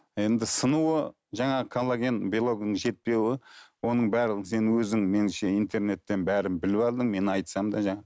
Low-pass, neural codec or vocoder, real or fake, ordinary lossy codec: none; none; real; none